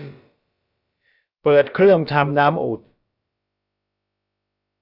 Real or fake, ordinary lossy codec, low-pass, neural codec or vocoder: fake; Opus, 64 kbps; 5.4 kHz; codec, 16 kHz, about 1 kbps, DyCAST, with the encoder's durations